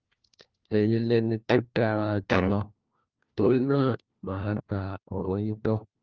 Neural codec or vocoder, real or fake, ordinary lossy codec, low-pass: codec, 16 kHz, 1 kbps, FunCodec, trained on LibriTTS, 50 frames a second; fake; Opus, 24 kbps; 7.2 kHz